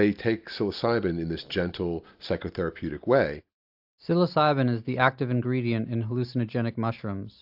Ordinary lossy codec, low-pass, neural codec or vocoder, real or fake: AAC, 48 kbps; 5.4 kHz; none; real